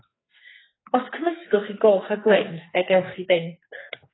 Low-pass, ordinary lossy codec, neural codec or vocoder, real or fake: 7.2 kHz; AAC, 16 kbps; codec, 44.1 kHz, 2.6 kbps, SNAC; fake